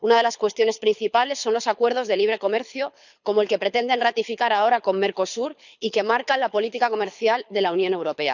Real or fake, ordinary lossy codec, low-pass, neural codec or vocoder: fake; none; 7.2 kHz; codec, 24 kHz, 6 kbps, HILCodec